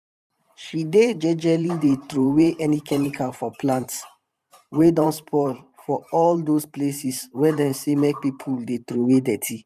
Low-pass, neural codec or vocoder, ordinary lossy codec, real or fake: 14.4 kHz; vocoder, 44.1 kHz, 128 mel bands every 512 samples, BigVGAN v2; none; fake